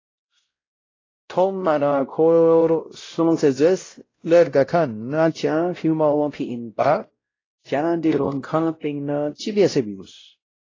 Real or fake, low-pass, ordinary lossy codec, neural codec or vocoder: fake; 7.2 kHz; AAC, 32 kbps; codec, 16 kHz, 0.5 kbps, X-Codec, WavLM features, trained on Multilingual LibriSpeech